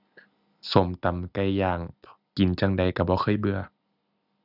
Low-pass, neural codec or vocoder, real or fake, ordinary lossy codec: 5.4 kHz; none; real; none